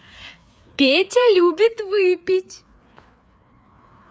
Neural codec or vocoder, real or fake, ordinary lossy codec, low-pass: codec, 16 kHz, 4 kbps, FreqCodec, larger model; fake; none; none